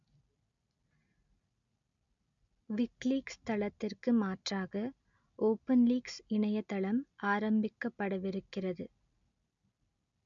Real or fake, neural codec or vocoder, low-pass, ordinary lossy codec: real; none; 7.2 kHz; MP3, 64 kbps